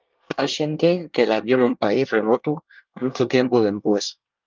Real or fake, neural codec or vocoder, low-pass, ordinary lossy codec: fake; codec, 24 kHz, 1 kbps, SNAC; 7.2 kHz; Opus, 32 kbps